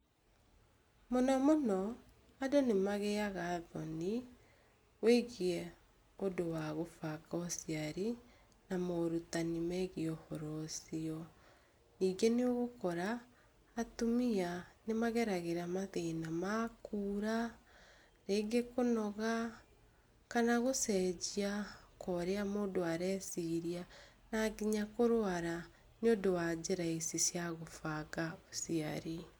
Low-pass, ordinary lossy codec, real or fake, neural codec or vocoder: none; none; real; none